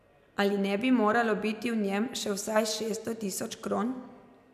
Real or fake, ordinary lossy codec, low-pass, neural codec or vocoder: real; none; 14.4 kHz; none